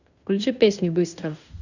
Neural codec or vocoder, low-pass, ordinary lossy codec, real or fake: codec, 16 kHz in and 24 kHz out, 0.9 kbps, LongCat-Audio-Codec, fine tuned four codebook decoder; 7.2 kHz; none; fake